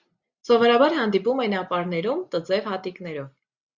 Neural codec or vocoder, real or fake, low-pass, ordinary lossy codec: none; real; 7.2 kHz; Opus, 64 kbps